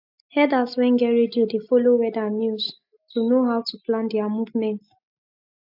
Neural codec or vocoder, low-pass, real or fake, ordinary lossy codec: none; 5.4 kHz; real; AAC, 48 kbps